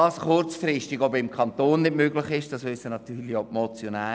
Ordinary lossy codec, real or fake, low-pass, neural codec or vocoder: none; real; none; none